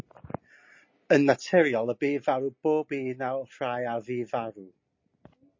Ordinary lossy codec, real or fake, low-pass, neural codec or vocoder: MP3, 32 kbps; real; 7.2 kHz; none